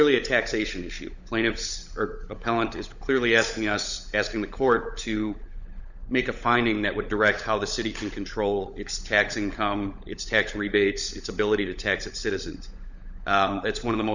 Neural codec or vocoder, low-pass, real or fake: codec, 16 kHz, 16 kbps, FunCodec, trained on LibriTTS, 50 frames a second; 7.2 kHz; fake